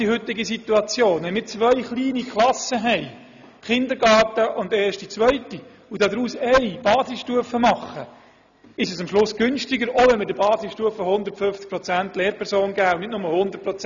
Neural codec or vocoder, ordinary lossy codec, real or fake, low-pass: none; none; real; 7.2 kHz